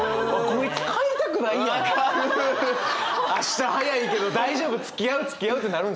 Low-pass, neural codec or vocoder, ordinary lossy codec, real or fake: none; none; none; real